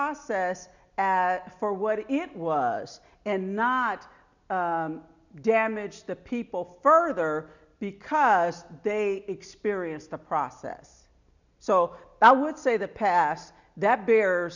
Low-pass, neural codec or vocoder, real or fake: 7.2 kHz; none; real